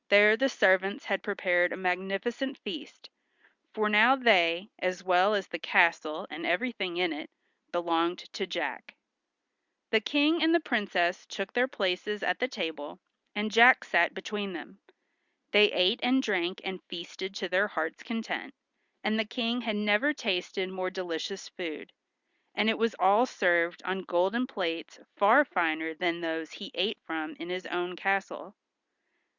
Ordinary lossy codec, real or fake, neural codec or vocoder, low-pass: Opus, 64 kbps; real; none; 7.2 kHz